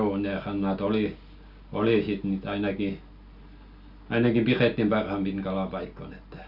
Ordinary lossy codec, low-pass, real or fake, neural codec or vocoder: none; 5.4 kHz; real; none